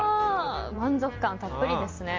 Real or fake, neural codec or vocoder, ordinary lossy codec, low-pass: real; none; Opus, 32 kbps; 7.2 kHz